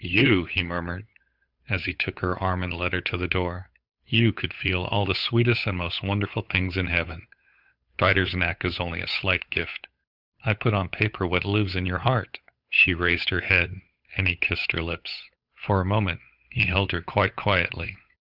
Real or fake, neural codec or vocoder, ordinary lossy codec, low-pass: fake; codec, 16 kHz, 8 kbps, FunCodec, trained on Chinese and English, 25 frames a second; Opus, 64 kbps; 5.4 kHz